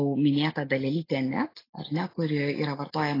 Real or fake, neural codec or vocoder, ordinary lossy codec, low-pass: real; none; AAC, 24 kbps; 5.4 kHz